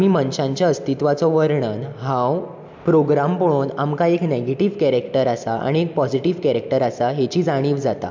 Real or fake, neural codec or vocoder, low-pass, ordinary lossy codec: real; none; 7.2 kHz; MP3, 64 kbps